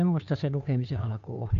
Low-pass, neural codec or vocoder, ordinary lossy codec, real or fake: 7.2 kHz; codec, 16 kHz, 2 kbps, FunCodec, trained on Chinese and English, 25 frames a second; none; fake